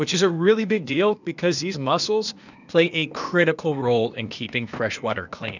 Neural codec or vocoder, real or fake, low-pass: codec, 16 kHz, 0.8 kbps, ZipCodec; fake; 7.2 kHz